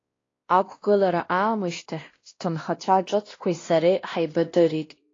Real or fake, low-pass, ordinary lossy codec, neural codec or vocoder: fake; 7.2 kHz; AAC, 32 kbps; codec, 16 kHz, 1 kbps, X-Codec, WavLM features, trained on Multilingual LibriSpeech